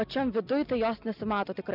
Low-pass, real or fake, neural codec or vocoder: 5.4 kHz; real; none